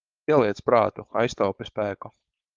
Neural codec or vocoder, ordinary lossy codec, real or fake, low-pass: codec, 16 kHz, 4.8 kbps, FACodec; Opus, 32 kbps; fake; 7.2 kHz